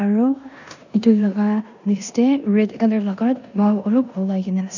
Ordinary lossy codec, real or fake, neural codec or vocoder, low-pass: none; fake; codec, 16 kHz in and 24 kHz out, 0.9 kbps, LongCat-Audio-Codec, four codebook decoder; 7.2 kHz